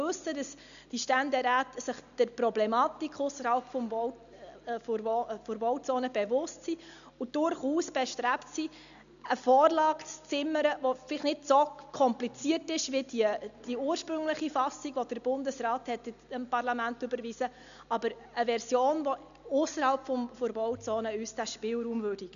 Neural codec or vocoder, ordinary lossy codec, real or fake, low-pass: none; none; real; 7.2 kHz